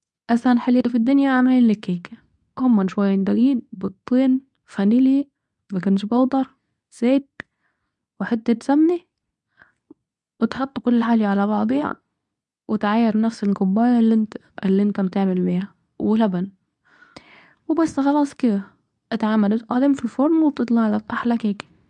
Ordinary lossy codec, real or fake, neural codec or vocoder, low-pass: none; fake; codec, 24 kHz, 0.9 kbps, WavTokenizer, medium speech release version 2; 10.8 kHz